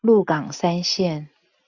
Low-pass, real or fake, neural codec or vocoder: 7.2 kHz; real; none